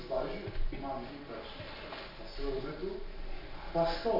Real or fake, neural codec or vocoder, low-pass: real; none; 5.4 kHz